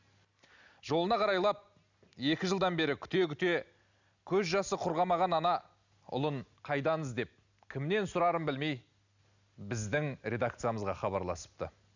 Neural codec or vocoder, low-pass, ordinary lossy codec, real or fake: none; 7.2 kHz; none; real